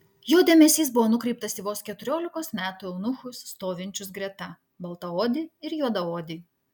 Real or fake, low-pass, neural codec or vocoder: real; 19.8 kHz; none